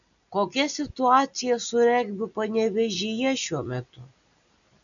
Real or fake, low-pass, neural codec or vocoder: real; 7.2 kHz; none